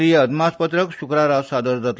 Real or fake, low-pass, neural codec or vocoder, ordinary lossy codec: real; none; none; none